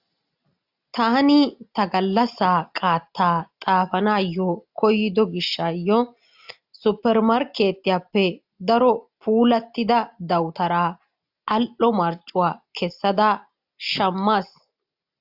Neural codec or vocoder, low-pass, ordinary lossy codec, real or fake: none; 5.4 kHz; AAC, 48 kbps; real